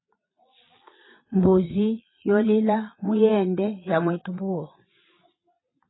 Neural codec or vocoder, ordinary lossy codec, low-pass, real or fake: vocoder, 22.05 kHz, 80 mel bands, Vocos; AAC, 16 kbps; 7.2 kHz; fake